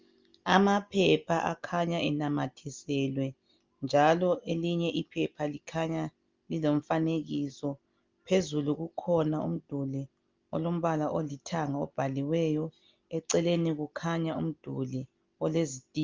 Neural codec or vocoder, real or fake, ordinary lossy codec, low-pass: none; real; Opus, 32 kbps; 7.2 kHz